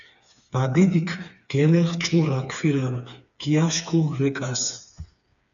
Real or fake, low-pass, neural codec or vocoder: fake; 7.2 kHz; codec, 16 kHz, 4 kbps, FreqCodec, smaller model